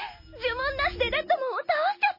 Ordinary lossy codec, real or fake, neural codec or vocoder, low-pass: MP3, 24 kbps; real; none; 5.4 kHz